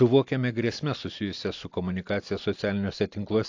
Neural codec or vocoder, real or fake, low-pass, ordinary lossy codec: none; real; 7.2 kHz; AAC, 48 kbps